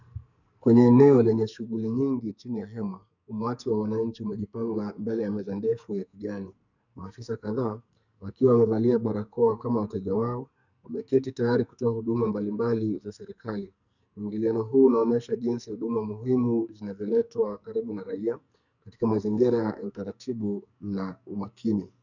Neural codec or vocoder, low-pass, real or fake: codec, 44.1 kHz, 2.6 kbps, SNAC; 7.2 kHz; fake